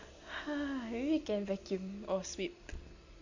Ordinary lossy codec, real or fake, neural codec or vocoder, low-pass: Opus, 64 kbps; real; none; 7.2 kHz